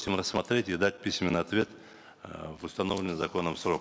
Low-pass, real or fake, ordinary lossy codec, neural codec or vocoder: none; real; none; none